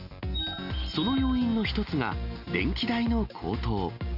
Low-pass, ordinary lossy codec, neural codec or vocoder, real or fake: 5.4 kHz; none; none; real